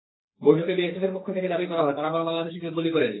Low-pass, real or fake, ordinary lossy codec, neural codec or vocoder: 7.2 kHz; fake; AAC, 16 kbps; codec, 44.1 kHz, 2.6 kbps, SNAC